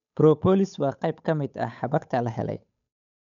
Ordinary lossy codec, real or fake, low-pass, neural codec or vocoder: none; fake; 7.2 kHz; codec, 16 kHz, 8 kbps, FunCodec, trained on Chinese and English, 25 frames a second